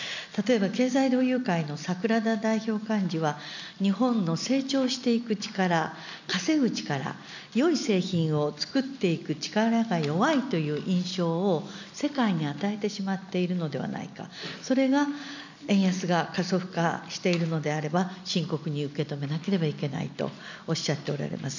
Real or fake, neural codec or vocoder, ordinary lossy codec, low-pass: real; none; none; 7.2 kHz